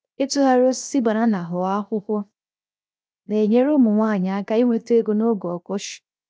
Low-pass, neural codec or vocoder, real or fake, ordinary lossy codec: none; codec, 16 kHz, 0.7 kbps, FocalCodec; fake; none